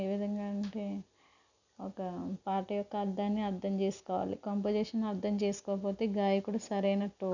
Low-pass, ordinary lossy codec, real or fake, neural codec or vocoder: 7.2 kHz; none; real; none